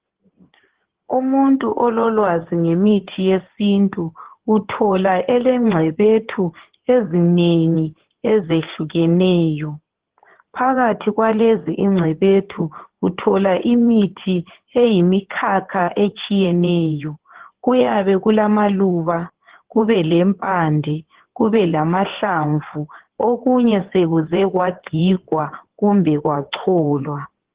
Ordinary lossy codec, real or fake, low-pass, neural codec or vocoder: Opus, 16 kbps; fake; 3.6 kHz; codec, 16 kHz in and 24 kHz out, 2.2 kbps, FireRedTTS-2 codec